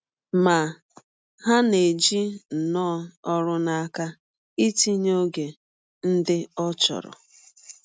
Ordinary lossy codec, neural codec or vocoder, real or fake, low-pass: none; none; real; none